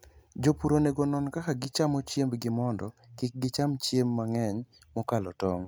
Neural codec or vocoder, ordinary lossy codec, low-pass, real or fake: none; none; none; real